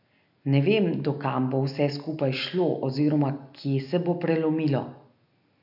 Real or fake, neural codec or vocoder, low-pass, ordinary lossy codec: real; none; 5.4 kHz; none